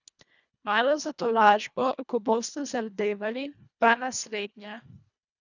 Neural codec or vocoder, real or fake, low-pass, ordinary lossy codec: codec, 24 kHz, 1.5 kbps, HILCodec; fake; 7.2 kHz; none